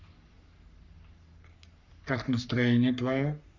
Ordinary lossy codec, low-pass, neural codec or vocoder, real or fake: Opus, 64 kbps; 7.2 kHz; codec, 44.1 kHz, 3.4 kbps, Pupu-Codec; fake